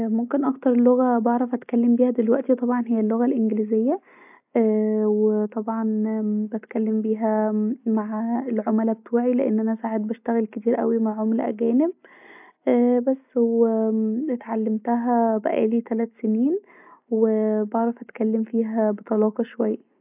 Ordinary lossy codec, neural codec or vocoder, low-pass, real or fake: AAC, 32 kbps; none; 3.6 kHz; real